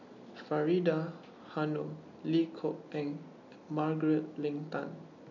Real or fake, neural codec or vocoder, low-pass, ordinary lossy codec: real; none; 7.2 kHz; none